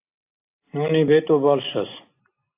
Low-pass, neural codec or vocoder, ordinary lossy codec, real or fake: 3.6 kHz; none; AAC, 24 kbps; real